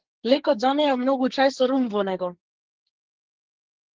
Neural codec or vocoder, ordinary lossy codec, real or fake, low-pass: codec, 44.1 kHz, 2.6 kbps, SNAC; Opus, 16 kbps; fake; 7.2 kHz